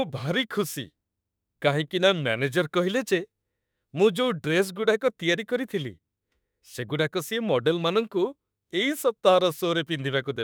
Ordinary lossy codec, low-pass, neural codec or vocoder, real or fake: none; none; autoencoder, 48 kHz, 32 numbers a frame, DAC-VAE, trained on Japanese speech; fake